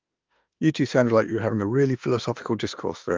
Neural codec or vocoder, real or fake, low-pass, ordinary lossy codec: autoencoder, 48 kHz, 32 numbers a frame, DAC-VAE, trained on Japanese speech; fake; 7.2 kHz; Opus, 24 kbps